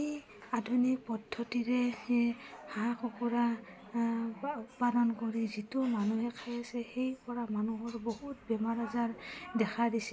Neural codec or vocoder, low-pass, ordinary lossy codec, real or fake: none; none; none; real